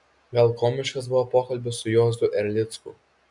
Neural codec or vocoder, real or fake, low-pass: none; real; 10.8 kHz